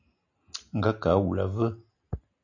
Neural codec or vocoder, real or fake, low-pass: none; real; 7.2 kHz